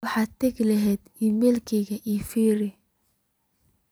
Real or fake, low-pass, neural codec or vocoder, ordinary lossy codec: fake; none; vocoder, 44.1 kHz, 128 mel bands every 256 samples, BigVGAN v2; none